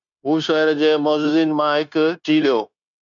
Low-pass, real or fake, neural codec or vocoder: 7.2 kHz; fake; codec, 16 kHz, 0.9 kbps, LongCat-Audio-Codec